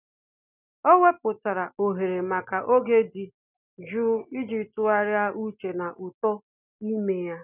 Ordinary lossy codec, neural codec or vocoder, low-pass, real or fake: none; none; 3.6 kHz; real